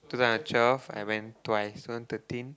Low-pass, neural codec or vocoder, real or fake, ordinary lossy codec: none; none; real; none